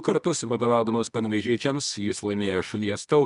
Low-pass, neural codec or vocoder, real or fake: 10.8 kHz; codec, 24 kHz, 0.9 kbps, WavTokenizer, medium music audio release; fake